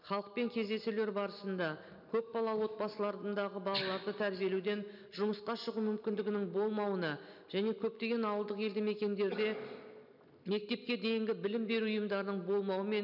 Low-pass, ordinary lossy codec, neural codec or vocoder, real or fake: 5.4 kHz; none; none; real